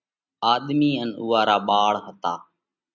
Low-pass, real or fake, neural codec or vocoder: 7.2 kHz; real; none